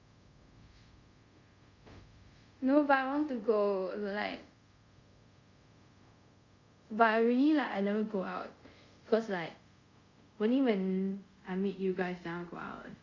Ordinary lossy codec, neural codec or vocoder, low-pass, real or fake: Opus, 64 kbps; codec, 24 kHz, 0.5 kbps, DualCodec; 7.2 kHz; fake